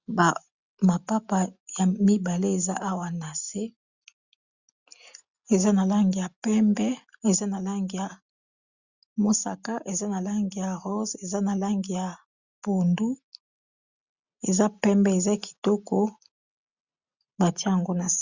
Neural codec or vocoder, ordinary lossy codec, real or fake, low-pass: none; Opus, 64 kbps; real; 7.2 kHz